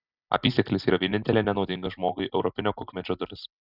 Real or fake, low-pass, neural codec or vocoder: real; 5.4 kHz; none